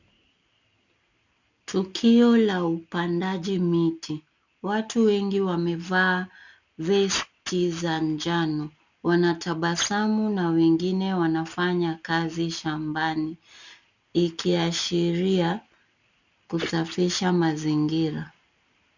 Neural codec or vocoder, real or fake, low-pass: none; real; 7.2 kHz